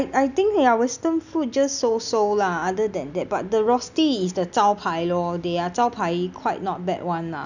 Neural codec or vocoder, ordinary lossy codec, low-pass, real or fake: none; none; 7.2 kHz; real